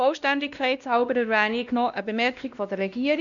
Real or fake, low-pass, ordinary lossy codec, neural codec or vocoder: fake; 7.2 kHz; none; codec, 16 kHz, 1 kbps, X-Codec, WavLM features, trained on Multilingual LibriSpeech